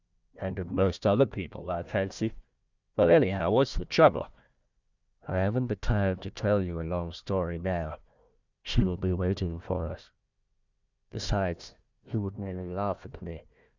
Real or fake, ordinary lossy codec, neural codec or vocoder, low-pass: fake; Opus, 64 kbps; codec, 16 kHz, 1 kbps, FunCodec, trained on Chinese and English, 50 frames a second; 7.2 kHz